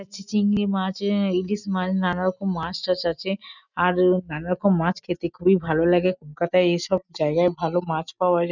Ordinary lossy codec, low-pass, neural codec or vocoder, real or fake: none; 7.2 kHz; none; real